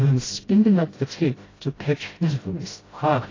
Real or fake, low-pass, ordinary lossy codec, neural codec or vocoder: fake; 7.2 kHz; AAC, 32 kbps; codec, 16 kHz, 0.5 kbps, FreqCodec, smaller model